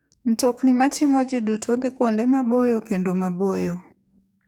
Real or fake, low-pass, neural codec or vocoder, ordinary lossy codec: fake; 19.8 kHz; codec, 44.1 kHz, 2.6 kbps, DAC; none